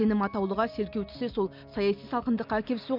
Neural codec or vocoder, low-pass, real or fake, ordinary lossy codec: none; 5.4 kHz; real; MP3, 48 kbps